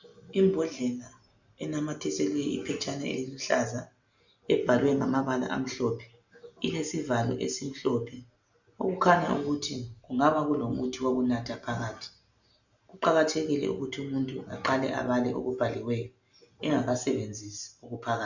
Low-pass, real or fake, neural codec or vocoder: 7.2 kHz; real; none